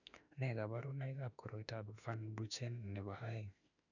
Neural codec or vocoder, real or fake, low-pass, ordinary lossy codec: autoencoder, 48 kHz, 32 numbers a frame, DAC-VAE, trained on Japanese speech; fake; 7.2 kHz; none